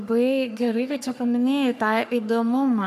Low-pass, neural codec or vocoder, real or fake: 14.4 kHz; codec, 44.1 kHz, 3.4 kbps, Pupu-Codec; fake